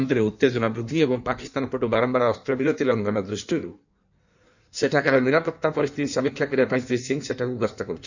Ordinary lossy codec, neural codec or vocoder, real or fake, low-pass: none; codec, 16 kHz in and 24 kHz out, 1.1 kbps, FireRedTTS-2 codec; fake; 7.2 kHz